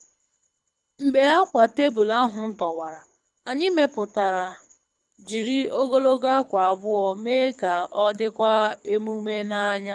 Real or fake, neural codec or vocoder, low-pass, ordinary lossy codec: fake; codec, 24 kHz, 3 kbps, HILCodec; 10.8 kHz; none